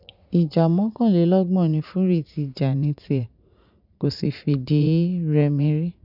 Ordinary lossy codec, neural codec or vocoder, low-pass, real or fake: none; vocoder, 44.1 kHz, 80 mel bands, Vocos; 5.4 kHz; fake